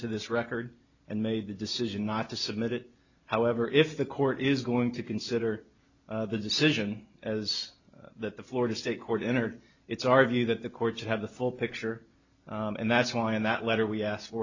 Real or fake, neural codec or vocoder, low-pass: real; none; 7.2 kHz